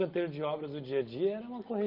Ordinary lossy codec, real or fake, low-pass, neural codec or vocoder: Opus, 24 kbps; real; 5.4 kHz; none